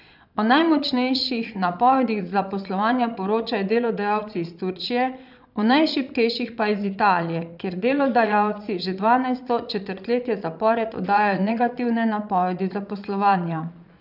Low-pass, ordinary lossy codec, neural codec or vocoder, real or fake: 5.4 kHz; none; vocoder, 22.05 kHz, 80 mel bands, Vocos; fake